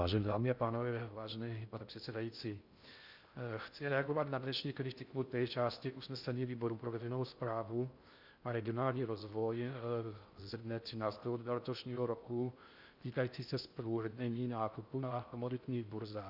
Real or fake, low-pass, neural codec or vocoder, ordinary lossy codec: fake; 5.4 kHz; codec, 16 kHz in and 24 kHz out, 0.6 kbps, FocalCodec, streaming, 2048 codes; Opus, 64 kbps